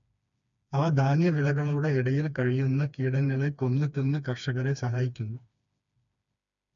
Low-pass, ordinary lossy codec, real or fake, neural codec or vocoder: 7.2 kHz; none; fake; codec, 16 kHz, 2 kbps, FreqCodec, smaller model